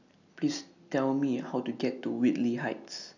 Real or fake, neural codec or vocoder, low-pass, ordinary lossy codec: real; none; 7.2 kHz; none